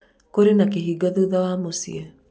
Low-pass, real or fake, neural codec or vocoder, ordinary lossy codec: none; real; none; none